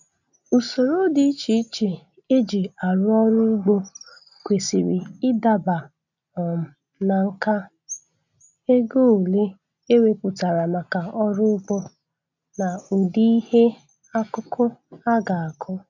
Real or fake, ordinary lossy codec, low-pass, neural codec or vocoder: real; none; 7.2 kHz; none